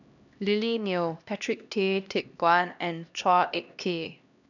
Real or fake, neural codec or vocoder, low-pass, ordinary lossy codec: fake; codec, 16 kHz, 1 kbps, X-Codec, HuBERT features, trained on LibriSpeech; 7.2 kHz; none